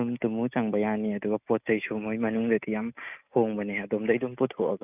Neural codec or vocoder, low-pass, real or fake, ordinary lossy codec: none; 3.6 kHz; real; none